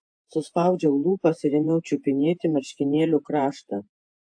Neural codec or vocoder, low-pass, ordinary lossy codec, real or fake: vocoder, 48 kHz, 128 mel bands, Vocos; 9.9 kHz; AAC, 64 kbps; fake